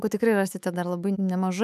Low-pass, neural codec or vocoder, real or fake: 14.4 kHz; autoencoder, 48 kHz, 128 numbers a frame, DAC-VAE, trained on Japanese speech; fake